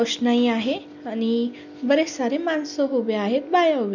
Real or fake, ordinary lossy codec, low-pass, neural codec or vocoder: real; none; 7.2 kHz; none